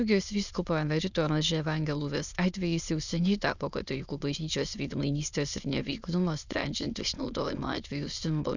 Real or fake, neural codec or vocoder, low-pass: fake; autoencoder, 22.05 kHz, a latent of 192 numbers a frame, VITS, trained on many speakers; 7.2 kHz